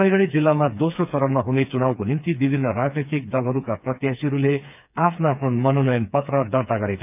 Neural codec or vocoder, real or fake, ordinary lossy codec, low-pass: codec, 16 kHz, 4 kbps, FreqCodec, smaller model; fake; none; 3.6 kHz